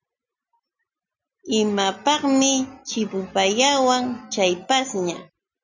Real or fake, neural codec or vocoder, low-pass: real; none; 7.2 kHz